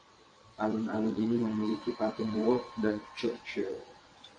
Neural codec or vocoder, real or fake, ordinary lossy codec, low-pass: vocoder, 22.05 kHz, 80 mel bands, WaveNeXt; fake; MP3, 48 kbps; 9.9 kHz